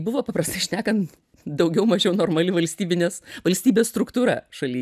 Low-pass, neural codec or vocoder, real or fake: 14.4 kHz; none; real